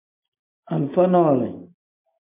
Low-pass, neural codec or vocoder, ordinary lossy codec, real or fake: 3.6 kHz; none; MP3, 24 kbps; real